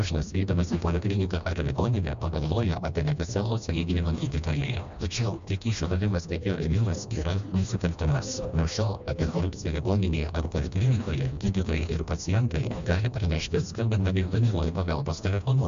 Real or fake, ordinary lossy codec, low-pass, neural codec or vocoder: fake; MP3, 64 kbps; 7.2 kHz; codec, 16 kHz, 1 kbps, FreqCodec, smaller model